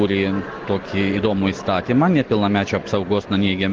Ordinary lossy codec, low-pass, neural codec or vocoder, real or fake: Opus, 16 kbps; 7.2 kHz; none; real